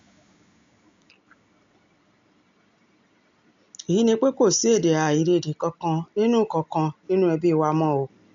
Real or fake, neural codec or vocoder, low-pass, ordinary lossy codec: real; none; 7.2 kHz; MP3, 64 kbps